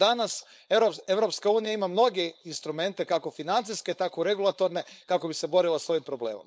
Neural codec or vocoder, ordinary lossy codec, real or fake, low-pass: codec, 16 kHz, 4.8 kbps, FACodec; none; fake; none